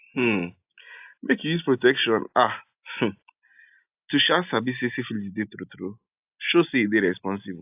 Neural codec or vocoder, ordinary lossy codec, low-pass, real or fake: none; AAC, 32 kbps; 3.6 kHz; real